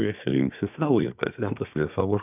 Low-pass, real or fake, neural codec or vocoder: 3.6 kHz; fake; codec, 24 kHz, 1 kbps, SNAC